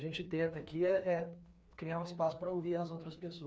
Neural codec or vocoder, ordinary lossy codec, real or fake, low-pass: codec, 16 kHz, 2 kbps, FreqCodec, larger model; none; fake; none